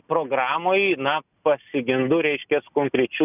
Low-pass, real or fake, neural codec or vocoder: 3.6 kHz; real; none